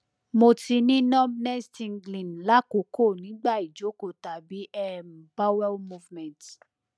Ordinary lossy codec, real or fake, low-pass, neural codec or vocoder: none; real; none; none